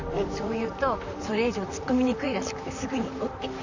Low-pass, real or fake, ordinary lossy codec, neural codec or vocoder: 7.2 kHz; fake; none; vocoder, 44.1 kHz, 128 mel bands, Pupu-Vocoder